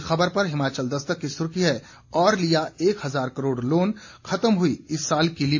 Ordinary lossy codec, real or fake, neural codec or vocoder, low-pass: AAC, 48 kbps; real; none; 7.2 kHz